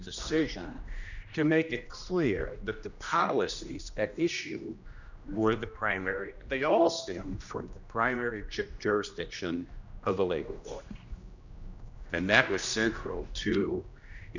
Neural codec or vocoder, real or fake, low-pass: codec, 16 kHz, 1 kbps, X-Codec, HuBERT features, trained on general audio; fake; 7.2 kHz